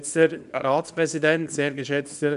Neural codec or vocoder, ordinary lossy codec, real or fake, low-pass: codec, 24 kHz, 0.9 kbps, WavTokenizer, small release; none; fake; 10.8 kHz